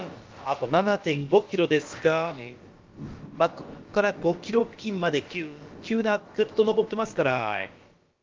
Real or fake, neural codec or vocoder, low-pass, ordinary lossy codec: fake; codec, 16 kHz, about 1 kbps, DyCAST, with the encoder's durations; 7.2 kHz; Opus, 32 kbps